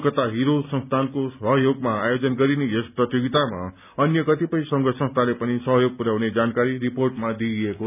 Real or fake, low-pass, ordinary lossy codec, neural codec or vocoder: real; 3.6 kHz; none; none